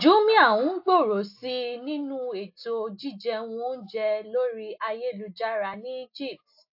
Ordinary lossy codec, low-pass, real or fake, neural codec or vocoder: AAC, 48 kbps; 5.4 kHz; real; none